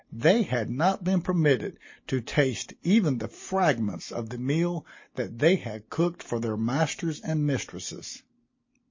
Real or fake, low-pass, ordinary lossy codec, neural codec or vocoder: real; 7.2 kHz; MP3, 32 kbps; none